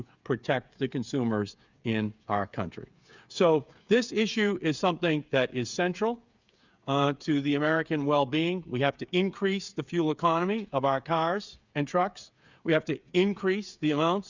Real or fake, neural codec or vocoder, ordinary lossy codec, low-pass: fake; codec, 16 kHz, 8 kbps, FreqCodec, smaller model; Opus, 64 kbps; 7.2 kHz